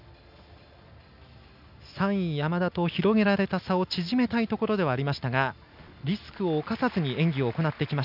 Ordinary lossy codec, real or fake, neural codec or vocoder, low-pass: none; real; none; 5.4 kHz